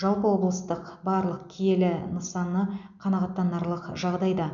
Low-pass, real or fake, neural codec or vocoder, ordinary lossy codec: 7.2 kHz; real; none; none